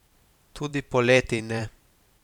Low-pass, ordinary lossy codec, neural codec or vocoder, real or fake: 19.8 kHz; none; vocoder, 44.1 kHz, 128 mel bands every 512 samples, BigVGAN v2; fake